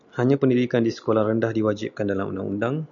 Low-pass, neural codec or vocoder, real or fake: 7.2 kHz; none; real